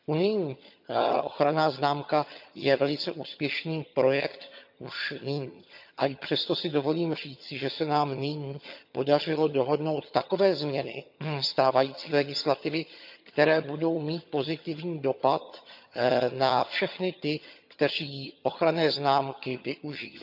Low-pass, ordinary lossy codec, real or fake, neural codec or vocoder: 5.4 kHz; none; fake; vocoder, 22.05 kHz, 80 mel bands, HiFi-GAN